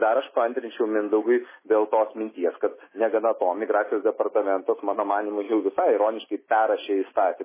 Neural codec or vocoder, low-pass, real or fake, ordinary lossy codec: none; 3.6 kHz; real; MP3, 16 kbps